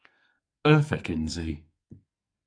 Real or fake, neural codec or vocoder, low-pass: fake; codec, 44.1 kHz, 2.6 kbps, SNAC; 9.9 kHz